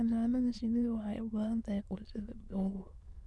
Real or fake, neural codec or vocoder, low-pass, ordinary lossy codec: fake; autoencoder, 22.05 kHz, a latent of 192 numbers a frame, VITS, trained on many speakers; none; none